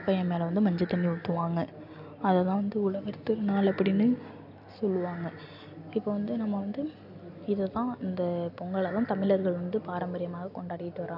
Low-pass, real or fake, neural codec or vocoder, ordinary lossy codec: 5.4 kHz; real; none; AAC, 48 kbps